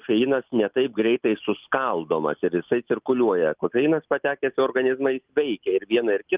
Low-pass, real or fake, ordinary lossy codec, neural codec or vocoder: 3.6 kHz; real; Opus, 24 kbps; none